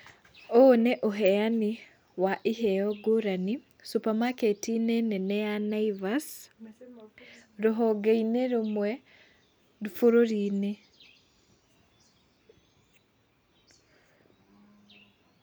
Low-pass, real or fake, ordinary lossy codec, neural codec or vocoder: none; real; none; none